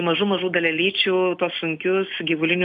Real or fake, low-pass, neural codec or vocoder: real; 10.8 kHz; none